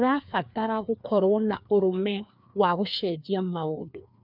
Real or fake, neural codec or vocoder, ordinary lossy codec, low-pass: fake; codec, 16 kHz, 2 kbps, FreqCodec, larger model; none; 5.4 kHz